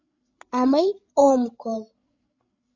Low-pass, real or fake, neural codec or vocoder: 7.2 kHz; fake; codec, 16 kHz, 16 kbps, FreqCodec, larger model